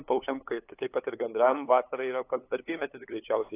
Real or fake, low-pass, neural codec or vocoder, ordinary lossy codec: fake; 3.6 kHz; codec, 16 kHz, 8 kbps, FunCodec, trained on LibriTTS, 25 frames a second; AAC, 24 kbps